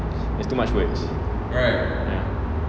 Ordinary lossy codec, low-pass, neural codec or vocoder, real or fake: none; none; none; real